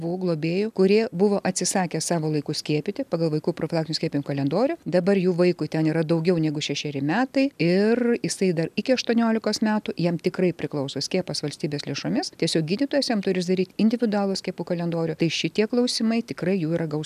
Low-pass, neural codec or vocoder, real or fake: 14.4 kHz; none; real